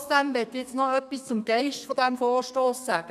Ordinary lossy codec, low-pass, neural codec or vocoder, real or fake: none; 14.4 kHz; codec, 32 kHz, 1.9 kbps, SNAC; fake